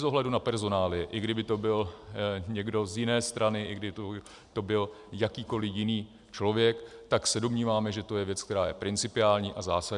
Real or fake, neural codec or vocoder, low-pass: real; none; 10.8 kHz